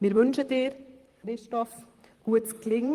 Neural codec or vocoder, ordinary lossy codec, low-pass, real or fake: vocoder, 44.1 kHz, 128 mel bands, Pupu-Vocoder; Opus, 24 kbps; 14.4 kHz; fake